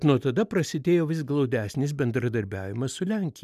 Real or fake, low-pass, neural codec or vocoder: real; 14.4 kHz; none